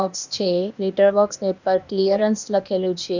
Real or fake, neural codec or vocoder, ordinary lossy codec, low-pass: fake; codec, 16 kHz, 0.8 kbps, ZipCodec; none; 7.2 kHz